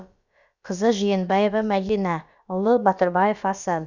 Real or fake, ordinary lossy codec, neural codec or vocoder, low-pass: fake; none; codec, 16 kHz, about 1 kbps, DyCAST, with the encoder's durations; 7.2 kHz